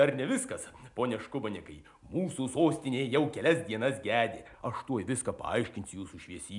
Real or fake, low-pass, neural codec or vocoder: real; 10.8 kHz; none